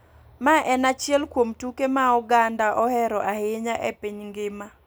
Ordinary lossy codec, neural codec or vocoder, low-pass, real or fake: none; none; none; real